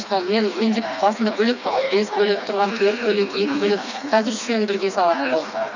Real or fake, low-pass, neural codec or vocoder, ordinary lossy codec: fake; 7.2 kHz; codec, 16 kHz, 2 kbps, FreqCodec, smaller model; none